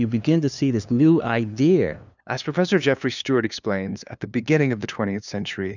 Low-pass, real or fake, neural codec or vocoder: 7.2 kHz; fake; codec, 16 kHz, 2 kbps, FunCodec, trained on LibriTTS, 25 frames a second